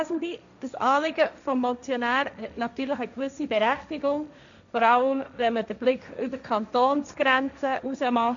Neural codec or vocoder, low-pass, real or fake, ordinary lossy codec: codec, 16 kHz, 1.1 kbps, Voila-Tokenizer; 7.2 kHz; fake; none